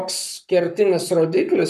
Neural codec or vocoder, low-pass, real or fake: codec, 44.1 kHz, 7.8 kbps, Pupu-Codec; 14.4 kHz; fake